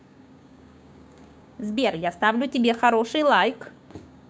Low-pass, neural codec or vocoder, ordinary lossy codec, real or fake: none; codec, 16 kHz, 6 kbps, DAC; none; fake